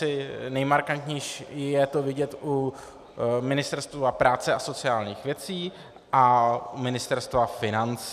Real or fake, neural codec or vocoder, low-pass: real; none; 14.4 kHz